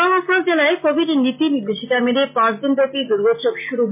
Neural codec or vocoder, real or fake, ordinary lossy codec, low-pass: none; real; MP3, 32 kbps; 3.6 kHz